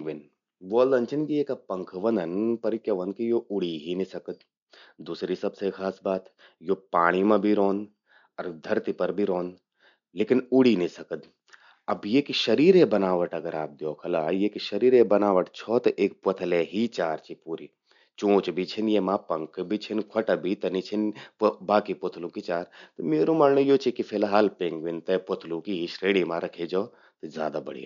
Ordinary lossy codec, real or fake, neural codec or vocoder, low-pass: none; real; none; 7.2 kHz